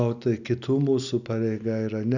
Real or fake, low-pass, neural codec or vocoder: real; 7.2 kHz; none